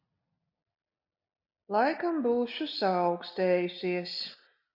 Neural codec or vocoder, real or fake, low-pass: none; real; 5.4 kHz